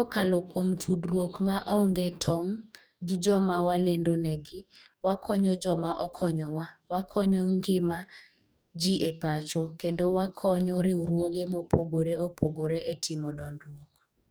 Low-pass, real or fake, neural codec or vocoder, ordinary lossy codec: none; fake; codec, 44.1 kHz, 2.6 kbps, DAC; none